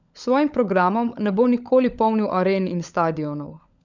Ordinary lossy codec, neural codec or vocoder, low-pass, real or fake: none; codec, 16 kHz, 16 kbps, FunCodec, trained on LibriTTS, 50 frames a second; 7.2 kHz; fake